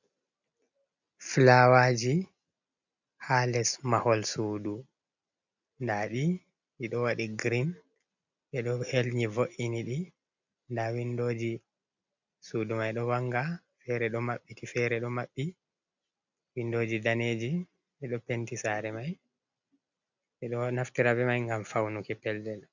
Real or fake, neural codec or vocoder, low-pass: real; none; 7.2 kHz